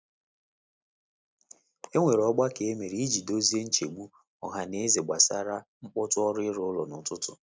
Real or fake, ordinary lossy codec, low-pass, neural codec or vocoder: real; none; none; none